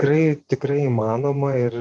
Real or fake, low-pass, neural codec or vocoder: fake; 10.8 kHz; vocoder, 24 kHz, 100 mel bands, Vocos